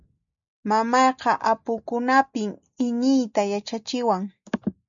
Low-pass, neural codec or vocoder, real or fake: 7.2 kHz; none; real